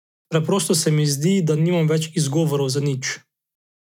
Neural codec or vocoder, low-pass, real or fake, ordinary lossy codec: none; 19.8 kHz; real; none